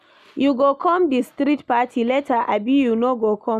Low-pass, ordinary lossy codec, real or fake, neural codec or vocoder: 14.4 kHz; none; real; none